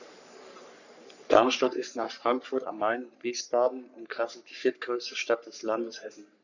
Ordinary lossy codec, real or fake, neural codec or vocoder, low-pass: none; fake; codec, 44.1 kHz, 3.4 kbps, Pupu-Codec; 7.2 kHz